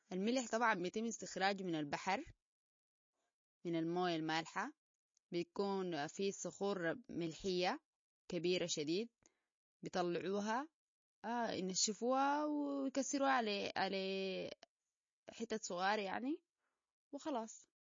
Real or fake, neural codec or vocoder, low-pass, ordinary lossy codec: real; none; 7.2 kHz; MP3, 32 kbps